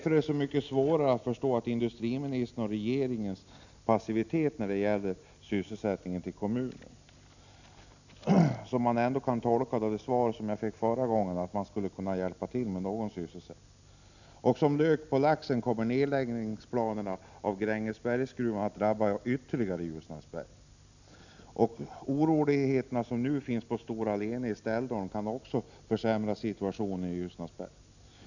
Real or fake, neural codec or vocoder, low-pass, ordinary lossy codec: real; none; 7.2 kHz; none